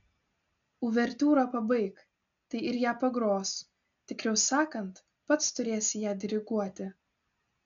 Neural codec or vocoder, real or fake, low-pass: none; real; 7.2 kHz